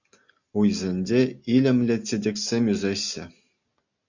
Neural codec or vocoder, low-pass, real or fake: none; 7.2 kHz; real